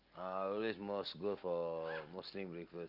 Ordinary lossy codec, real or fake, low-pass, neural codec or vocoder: Opus, 32 kbps; real; 5.4 kHz; none